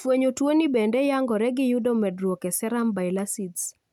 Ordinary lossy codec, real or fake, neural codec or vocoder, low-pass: none; real; none; 19.8 kHz